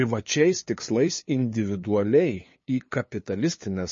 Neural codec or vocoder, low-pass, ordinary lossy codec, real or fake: codec, 16 kHz, 2 kbps, FunCodec, trained on LibriTTS, 25 frames a second; 7.2 kHz; MP3, 32 kbps; fake